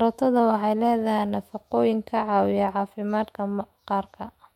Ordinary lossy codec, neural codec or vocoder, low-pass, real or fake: MP3, 64 kbps; autoencoder, 48 kHz, 128 numbers a frame, DAC-VAE, trained on Japanese speech; 19.8 kHz; fake